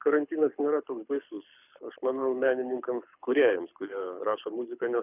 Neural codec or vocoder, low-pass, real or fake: codec, 24 kHz, 6 kbps, HILCodec; 3.6 kHz; fake